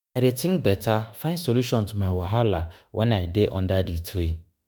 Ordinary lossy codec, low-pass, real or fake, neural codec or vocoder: none; none; fake; autoencoder, 48 kHz, 32 numbers a frame, DAC-VAE, trained on Japanese speech